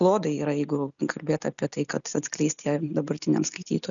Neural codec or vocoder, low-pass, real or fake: none; 7.2 kHz; real